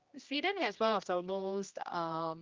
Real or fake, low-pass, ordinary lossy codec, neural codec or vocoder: fake; 7.2 kHz; Opus, 24 kbps; codec, 16 kHz, 1 kbps, X-Codec, HuBERT features, trained on general audio